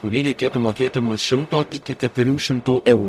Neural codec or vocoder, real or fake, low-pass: codec, 44.1 kHz, 0.9 kbps, DAC; fake; 14.4 kHz